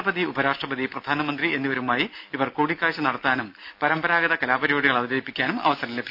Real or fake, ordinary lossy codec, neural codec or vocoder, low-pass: real; AAC, 48 kbps; none; 5.4 kHz